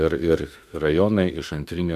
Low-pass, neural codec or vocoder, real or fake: 14.4 kHz; autoencoder, 48 kHz, 32 numbers a frame, DAC-VAE, trained on Japanese speech; fake